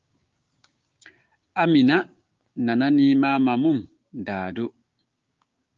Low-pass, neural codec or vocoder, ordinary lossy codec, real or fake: 7.2 kHz; codec, 16 kHz, 6 kbps, DAC; Opus, 32 kbps; fake